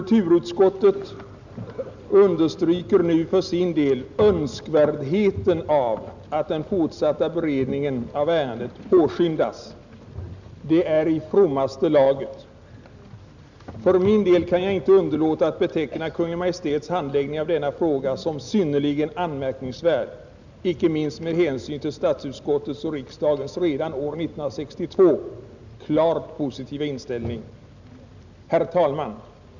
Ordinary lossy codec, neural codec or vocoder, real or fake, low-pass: none; none; real; 7.2 kHz